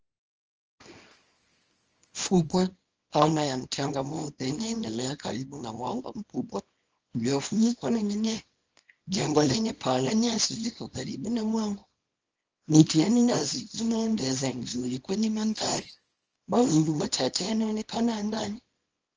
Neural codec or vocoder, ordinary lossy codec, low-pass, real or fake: codec, 24 kHz, 0.9 kbps, WavTokenizer, small release; Opus, 24 kbps; 7.2 kHz; fake